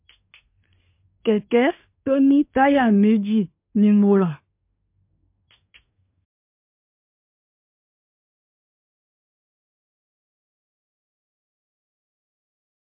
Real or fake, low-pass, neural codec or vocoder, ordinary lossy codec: fake; 3.6 kHz; codec, 24 kHz, 1 kbps, SNAC; MP3, 32 kbps